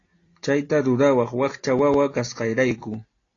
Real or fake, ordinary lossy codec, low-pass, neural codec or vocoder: real; AAC, 32 kbps; 7.2 kHz; none